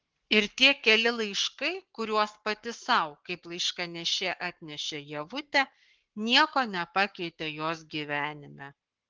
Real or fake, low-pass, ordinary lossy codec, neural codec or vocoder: fake; 7.2 kHz; Opus, 16 kbps; codec, 44.1 kHz, 7.8 kbps, Pupu-Codec